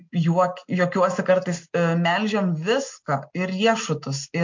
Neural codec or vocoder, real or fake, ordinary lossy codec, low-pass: none; real; MP3, 48 kbps; 7.2 kHz